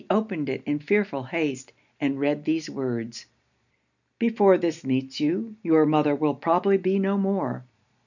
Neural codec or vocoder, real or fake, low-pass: none; real; 7.2 kHz